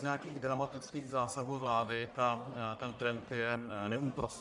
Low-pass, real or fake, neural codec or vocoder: 10.8 kHz; fake; codec, 44.1 kHz, 1.7 kbps, Pupu-Codec